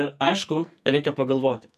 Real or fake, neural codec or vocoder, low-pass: fake; codec, 44.1 kHz, 2.6 kbps, SNAC; 14.4 kHz